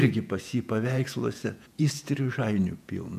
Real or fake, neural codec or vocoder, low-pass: fake; vocoder, 48 kHz, 128 mel bands, Vocos; 14.4 kHz